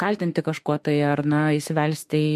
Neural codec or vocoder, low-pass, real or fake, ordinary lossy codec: autoencoder, 48 kHz, 32 numbers a frame, DAC-VAE, trained on Japanese speech; 14.4 kHz; fake; MP3, 64 kbps